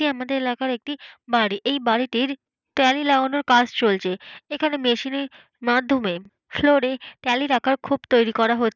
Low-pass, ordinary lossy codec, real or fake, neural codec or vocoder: 7.2 kHz; none; real; none